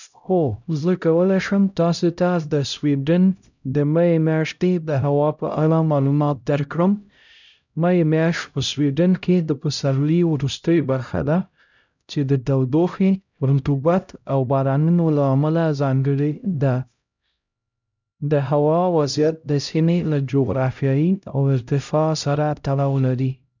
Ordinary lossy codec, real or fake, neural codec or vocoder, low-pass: none; fake; codec, 16 kHz, 0.5 kbps, X-Codec, HuBERT features, trained on LibriSpeech; 7.2 kHz